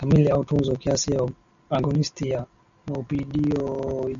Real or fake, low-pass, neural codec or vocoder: real; 7.2 kHz; none